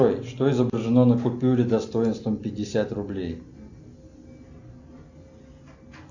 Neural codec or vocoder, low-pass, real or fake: none; 7.2 kHz; real